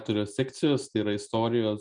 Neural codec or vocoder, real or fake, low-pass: none; real; 9.9 kHz